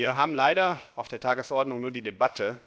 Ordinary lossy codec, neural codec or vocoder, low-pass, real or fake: none; codec, 16 kHz, about 1 kbps, DyCAST, with the encoder's durations; none; fake